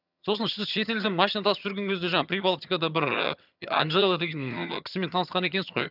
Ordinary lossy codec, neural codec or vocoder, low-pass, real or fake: none; vocoder, 22.05 kHz, 80 mel bands, HiFi-GAN; 5.4 kHz; fake